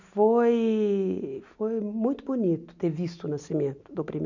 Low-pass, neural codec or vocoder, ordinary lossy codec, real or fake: 7.2 kHz; none; none; real